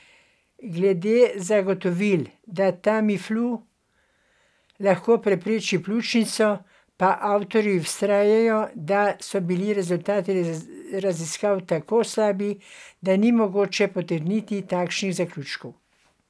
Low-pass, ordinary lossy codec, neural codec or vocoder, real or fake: none; none; none; real